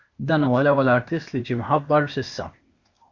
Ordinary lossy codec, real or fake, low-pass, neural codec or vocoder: Opus, 64 kbps; fake; 7.2 kHz; codec, 16 kHz, 0.8 kbps, ZipCodec